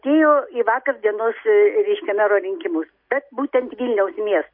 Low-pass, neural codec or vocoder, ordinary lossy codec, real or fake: 5.4 kHz; none; MP3, 32 kbps; real